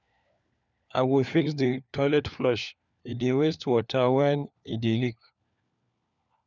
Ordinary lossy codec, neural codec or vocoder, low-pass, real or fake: none; codec, 16 kHz, 4 kbps, FunCodec, trained on LibriTTS, 50 frames a second; 7.2 kHz; fake